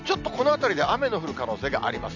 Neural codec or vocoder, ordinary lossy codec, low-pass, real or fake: vocoder, 44.1 kHz, 128 mel bands every 256 samples, BigVGAN v2; none; 7.2 kHz; fake